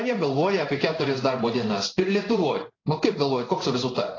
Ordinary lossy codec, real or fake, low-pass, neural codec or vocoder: AAC, 32 kbps; fake; 7.2 kHz; codec, 16 kHz in and 24 kHz out, 1 kbps, XY-Tokenizer